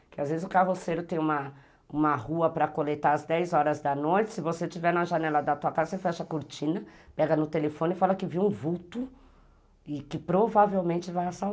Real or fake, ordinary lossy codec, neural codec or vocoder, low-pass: real; none; none; none